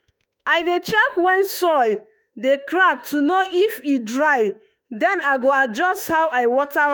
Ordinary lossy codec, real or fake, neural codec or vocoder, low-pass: none; fake; autoencoder, 48 kHz, 32 numbers a frame, DAC-VAE, trained on Japanese speech; none